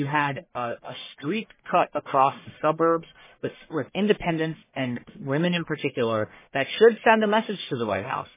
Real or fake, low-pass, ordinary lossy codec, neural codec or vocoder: fake; 3.6 kHz; MP3, 16 kbps; codec, 44.1 kHz, 1.7 kbps, Pupu-Codec